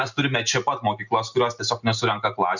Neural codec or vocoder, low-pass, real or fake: none; 7.2 kHz; real